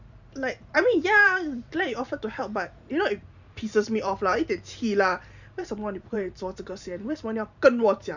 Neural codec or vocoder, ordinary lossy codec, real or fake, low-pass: vocoder, 44.1 kHz, 128 mel bands every 512 samples, BigVGAN v2; none; fake; 7.2 kHz